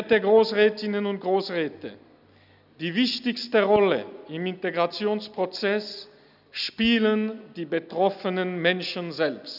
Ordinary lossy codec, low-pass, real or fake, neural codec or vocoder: none; 5.4 kHz; real; none